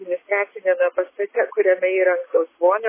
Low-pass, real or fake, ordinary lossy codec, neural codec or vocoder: 3.6 kHz; real; MP3, 16 kbps; none